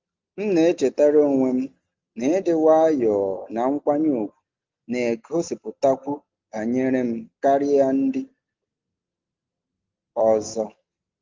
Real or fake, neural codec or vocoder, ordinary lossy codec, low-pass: real; none; Opus, 32 kbps; 7.2 kHz